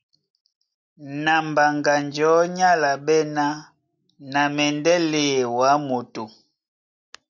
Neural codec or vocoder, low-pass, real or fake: none; 7.2 kHz; real